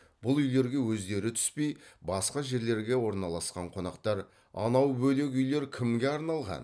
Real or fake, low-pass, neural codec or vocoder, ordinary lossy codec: real; none; none; none